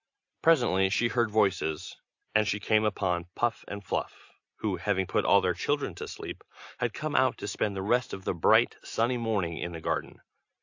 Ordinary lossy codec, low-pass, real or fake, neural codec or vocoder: AAC, 48 kbps; 7.2 kHz; real; none